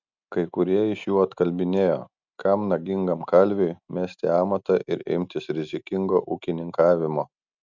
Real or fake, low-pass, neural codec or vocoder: real; 7.2 kHz; none